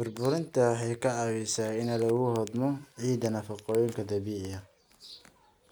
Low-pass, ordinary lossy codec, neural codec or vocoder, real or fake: none; none; none; real